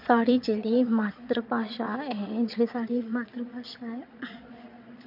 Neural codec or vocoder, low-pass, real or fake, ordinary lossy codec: vocoder, 22.05 kHz, 80 mel bands, Vocos; 5.4 kHz; fake; MP3, 48 kbps